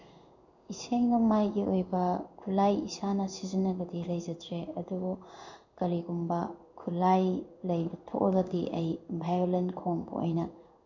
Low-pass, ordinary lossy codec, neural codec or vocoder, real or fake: 7.2 kHz; none; codec, 16 kHz in and 24 kHz out, 1 kbps, XY-Tokenizer; fake